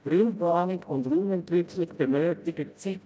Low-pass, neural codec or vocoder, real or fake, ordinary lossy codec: none; codec, 16 kHz, 0.5 kbps, FreqCodec, smaller model; fake; none